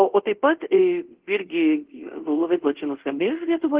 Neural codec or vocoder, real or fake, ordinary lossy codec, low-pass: codec, 24 kHz, 0.5 kbps, DualCodec; fake; Opus, 16 kbps; 3.6 kHz